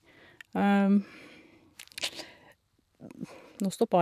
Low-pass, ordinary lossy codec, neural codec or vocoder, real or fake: 14.4 kHz; none; vocoder, 44.1 kHz, 128 mel bands every 512 samples, BigVGAN v2; fake